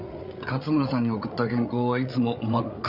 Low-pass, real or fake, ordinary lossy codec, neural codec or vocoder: 5.4 kHz; fake; none; codec, 16 kHz, 8 kbps, FreqCodec, larger model